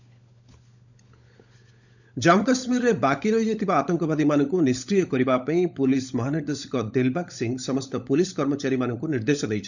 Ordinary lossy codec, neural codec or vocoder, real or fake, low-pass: none; codec, 16 kHz, 16 kbps, FunCodec, trained on LibriTTS, 50 frames a second; fake; 7.2 kHz